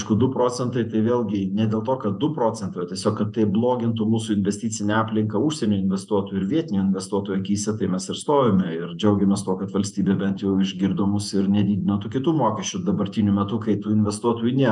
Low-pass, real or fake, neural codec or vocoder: 10.8 kHz; fake; vocoder, 48 kHz, 128 mel bands, Vocos